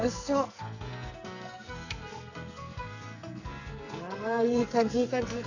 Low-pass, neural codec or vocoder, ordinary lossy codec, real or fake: 7.2 kHz; codec, 44.1 kHz, 2.6 kbps, SNAC; none; fake